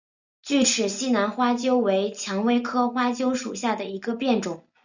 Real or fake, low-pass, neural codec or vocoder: real; 7.2 kHz; none